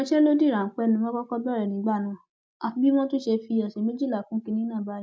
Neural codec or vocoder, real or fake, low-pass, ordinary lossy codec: none; real; none; none